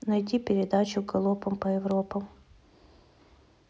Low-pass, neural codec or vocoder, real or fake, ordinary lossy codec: none; none; real; none